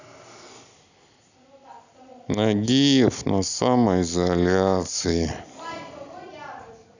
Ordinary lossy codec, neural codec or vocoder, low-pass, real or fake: none; none; 7.2 kHz; real